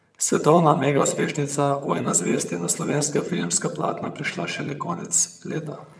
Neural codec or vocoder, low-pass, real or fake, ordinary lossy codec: vocoder, 22.05 kHz, 80 mel bands, HiFi-GAN; none; fake; none